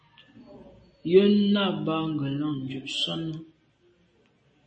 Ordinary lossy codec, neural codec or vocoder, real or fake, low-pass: MP3, 32 kbps; none; real; 9.9 kHz